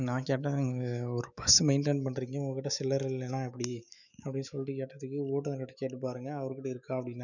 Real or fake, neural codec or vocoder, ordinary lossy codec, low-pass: fake; autoencoder, 48 kHz, 128 numbers a frame, DAC-VAE, trained on Japanese speech; none; 7.2 kHz